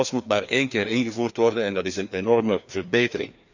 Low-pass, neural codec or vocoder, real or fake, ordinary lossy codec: 7.2 kHz; codec, 16 kHz, 2 kbps, FreqCodec, larger model; fake; none